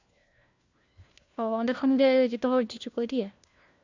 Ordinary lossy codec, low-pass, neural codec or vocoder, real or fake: Opus, 64 kbps; 7.2 kHz; codec, 16 kHz, 1 kbps, FunCodec, trained on LibriTTS, 50 frames a second; fake